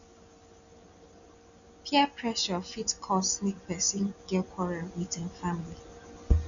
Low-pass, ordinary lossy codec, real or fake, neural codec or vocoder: 7.2 kHz; none; real; none